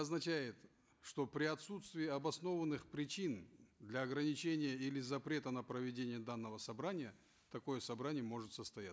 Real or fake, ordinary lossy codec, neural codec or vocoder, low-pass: real; none; none; none